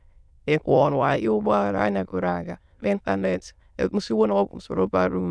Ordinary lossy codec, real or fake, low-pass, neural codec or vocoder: none; fake; none; autoencoder, 22.05 kHz, a latent of 192 numbers a frame, VITS, trained on many speakers